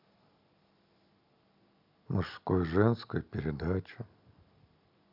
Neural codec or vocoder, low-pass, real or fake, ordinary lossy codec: none; 5.4 kHz; real; none